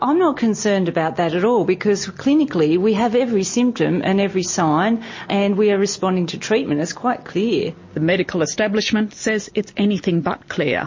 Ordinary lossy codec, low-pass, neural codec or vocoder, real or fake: MP3, 32 kbps; 7.2 kHz; none; real